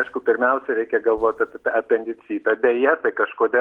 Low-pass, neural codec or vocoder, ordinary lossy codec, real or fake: 19.8 kHz; none; Opus, 16 kbps; real